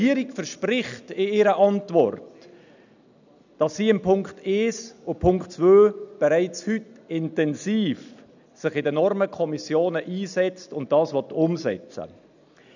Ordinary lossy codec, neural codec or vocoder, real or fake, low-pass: none; none; real; 7.2 kHz